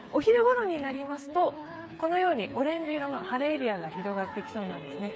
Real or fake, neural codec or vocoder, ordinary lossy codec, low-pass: fake; codec, 16 kHz, 4 kbps, FreqCodec, smaller model; none; none